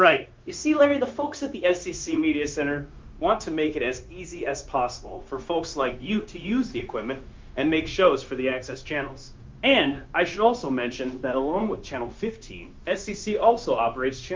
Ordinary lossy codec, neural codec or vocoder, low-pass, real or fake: Opus, 32 kbps; codec, 16 kHz, 0.9 kbps, LongCat-Audio-Codec; 7.2 kHz; fake